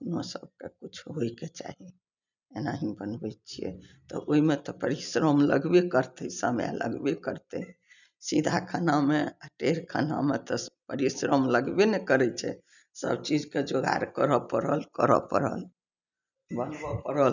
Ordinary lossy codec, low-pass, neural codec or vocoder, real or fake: none; 7.2 kHz; none; real